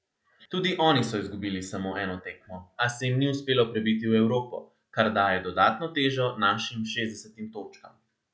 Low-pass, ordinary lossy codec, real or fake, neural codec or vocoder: none; none; real; none